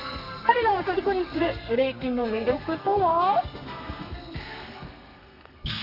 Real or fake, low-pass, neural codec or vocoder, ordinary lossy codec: fake; 5.4 kHz; codec, 32 kHz, 1.9 kbps, SNAC; none